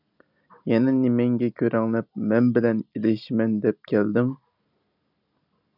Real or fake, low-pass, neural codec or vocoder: real; 5.4 kHz; none